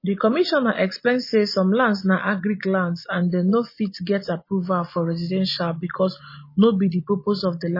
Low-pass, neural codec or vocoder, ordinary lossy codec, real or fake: 5.4 kHz; none; MP3, 24 kbps; real